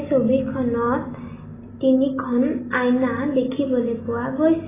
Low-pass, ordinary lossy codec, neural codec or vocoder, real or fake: 3.6 kHz; AAC, 16 kbps; none; real